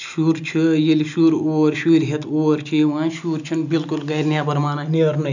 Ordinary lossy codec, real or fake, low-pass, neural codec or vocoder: none; real; 7.2 kHz; none